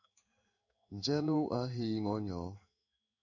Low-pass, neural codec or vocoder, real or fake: 7.2 kHz; codec, 16 kHz in and 24 kHz out, 1 kbps, XY-Tokenizer; fake